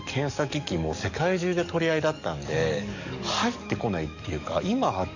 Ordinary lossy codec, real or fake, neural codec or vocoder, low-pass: none; fake; codec, 44.1 kHz, 7.8 kbps, DAC; 7.2 kHz